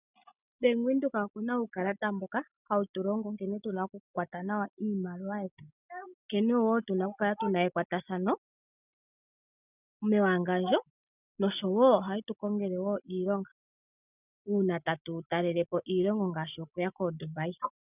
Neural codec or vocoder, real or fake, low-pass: none; real; 3.6 kHz